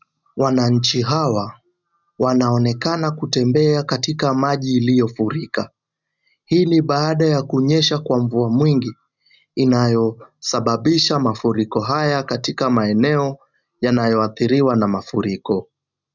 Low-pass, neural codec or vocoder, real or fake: 7.2 kHz; none; real